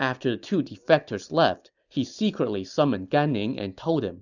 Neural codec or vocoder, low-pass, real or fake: none; 7.2 kHz; real